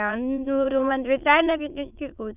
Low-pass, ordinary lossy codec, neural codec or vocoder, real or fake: 3.6 kHz; none; autoencoder, 22.05 kHz, a latent of 192 numbers a frame, VITS, trained on many speakers; fake